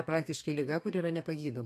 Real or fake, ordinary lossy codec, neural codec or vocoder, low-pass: fake; AAC, 64 kbps; codec, 32 kHz, 1.9 kbps, SNAC; 14.4 kHz